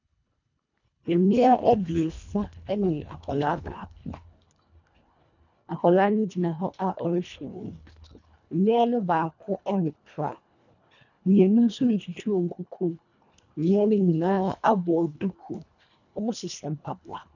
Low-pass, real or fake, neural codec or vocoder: 7.2 kHz; fake; codec, 24 kHz, 1.5 kbps, HILCodec